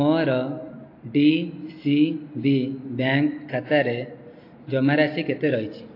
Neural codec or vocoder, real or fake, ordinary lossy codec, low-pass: none; real; AAC, 32 kbps; 5.4 kHz